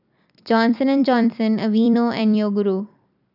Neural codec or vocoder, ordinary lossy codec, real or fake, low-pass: vocoder, 44.1 kHz, 128 mel bands every 256 samples, BigVGAN v2; none; fake; 5.4 kHz